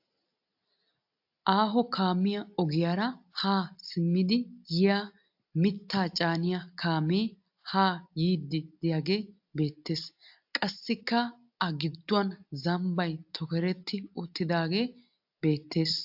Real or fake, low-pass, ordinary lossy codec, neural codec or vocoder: real; 5.4 kHz; AAC, 48 kbps; none